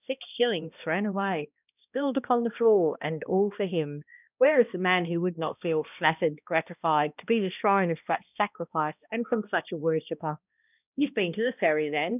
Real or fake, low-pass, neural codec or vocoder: fake; 3.6 kHz; codec, 16 kHz, 1 kbps, X-Codec, HuBERT features, trained on balanced general audio